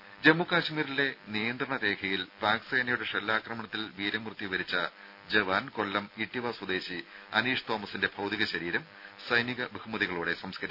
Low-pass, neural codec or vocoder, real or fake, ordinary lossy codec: 5.4 kHz; none; real; none